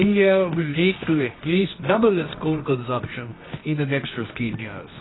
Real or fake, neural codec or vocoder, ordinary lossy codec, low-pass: fake; codec, 24 kHz, 0.9 kbps, WavTokenizer, medium music audio release; AAC, 16 kbps; 7.2 kHz